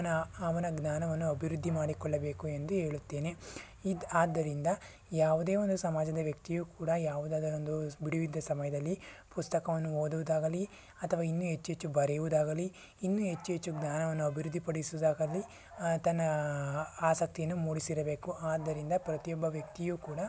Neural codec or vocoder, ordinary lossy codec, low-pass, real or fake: none; none; none; real